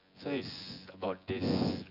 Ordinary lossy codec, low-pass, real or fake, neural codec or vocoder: AAC, 48 kbps; 5.4 kHz; fake; vocoder, 24 kHz, 100 mel bands, Vocos